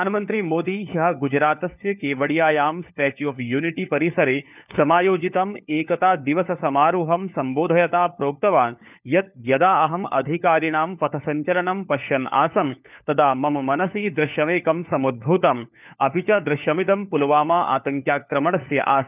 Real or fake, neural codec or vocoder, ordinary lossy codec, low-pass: fake; codec, 16 kHz, 4 kbps, FunCodec, trained on LibriTTS, 50 frames a second; none; 3.6 kHz